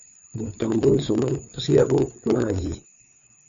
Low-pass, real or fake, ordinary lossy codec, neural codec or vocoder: 7.2 kHz; fake; MP3, 64 kbps; codec, 16 kHz, 4 kbps, FreqCodec, larger model